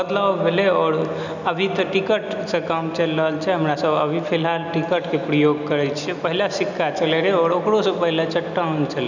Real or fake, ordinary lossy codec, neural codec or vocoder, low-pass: real; none; none; 7.2 kHz